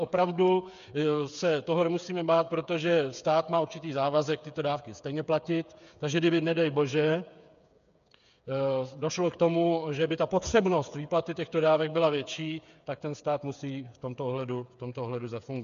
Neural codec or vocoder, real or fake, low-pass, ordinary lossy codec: codec, 16 kHz, 8 kbps, FreqCodec, smaller model; fake; 7.2 kHz; MP3, 96 kbps